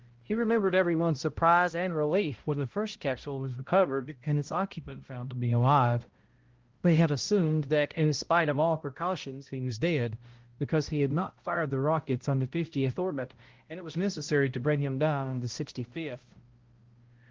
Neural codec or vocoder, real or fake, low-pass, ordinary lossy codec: codec, 16 kHz, 0.5 kbps, X-Codec, HuBERT features, trained on balanced general audio; fake; 7.2 kHz; Opus, 16 kbps